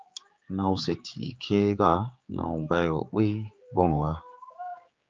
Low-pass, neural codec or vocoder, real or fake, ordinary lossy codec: 7.2 kHz; codec, 16 kHz, 4 kbps, X-Codec, HuBERT features, trained on general audio; fake; Opus, 24 kbps